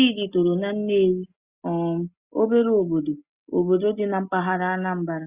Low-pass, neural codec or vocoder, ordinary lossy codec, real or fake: 3.6 kHz; none; Opus, 64 kbps; real